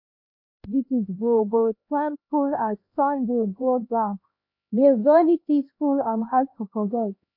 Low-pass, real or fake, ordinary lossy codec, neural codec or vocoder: 5.4 kHz; fake; MP3, 32 kbps; codec, 16 kHz, 1 kbps, X-Codec, HuBERT features, trained on LibriSpeech